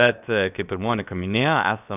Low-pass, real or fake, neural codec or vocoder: 3.6 kHz; fake; codec, 16 kHz, about 1 kbps, DyCAST, with the encoder's durations